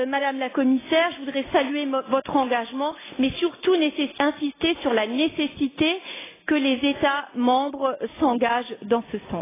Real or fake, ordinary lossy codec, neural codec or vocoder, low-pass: real; AAC, 16 kbps; none; 3.6 kHz